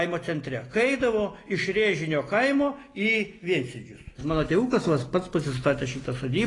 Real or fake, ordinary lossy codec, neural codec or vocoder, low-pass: real; AAC, 32 kbps; none; 10.8 kHz